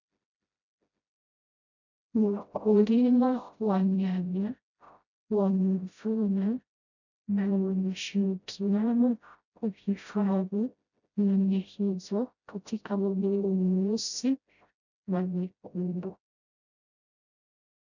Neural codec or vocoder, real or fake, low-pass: codec, 16 kHz, 0.5 kbps, FreqCodec, smaller model; fake; 7.2 kHz